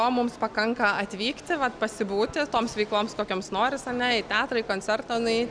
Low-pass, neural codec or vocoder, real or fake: 9.9 kHz; none; real